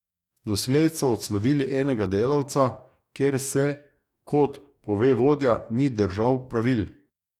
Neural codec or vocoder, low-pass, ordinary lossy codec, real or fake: codec, 44.1 kHz, 2.6 kbps, DAC; 19.8 kHz; none; fake